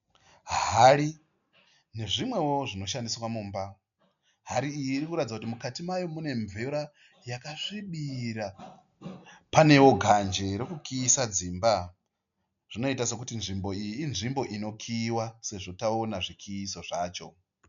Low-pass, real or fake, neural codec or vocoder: 7.2 kHz; real; none